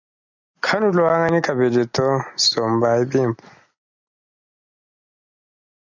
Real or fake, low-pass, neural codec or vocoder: real; 7.2 kHz; none